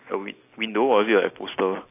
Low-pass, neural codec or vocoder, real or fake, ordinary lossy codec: 3.6 kHz; none; real; AAC, 24 kbps